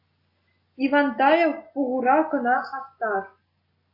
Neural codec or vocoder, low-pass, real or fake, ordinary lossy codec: none; 5.4 kHz; real; AAC, 32 kbps